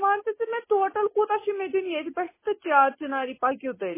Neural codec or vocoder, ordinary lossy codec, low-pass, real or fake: none; MP3, 16 kbps; 3.6 kHz; real